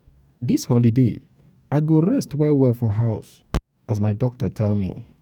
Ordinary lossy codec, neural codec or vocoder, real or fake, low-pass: none; codec, 44.1 kHz, 2.6 kbps, DAC; fake; 19.8 kHz